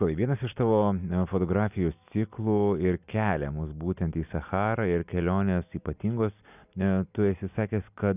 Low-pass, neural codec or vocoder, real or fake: 3.6 kHz; none; real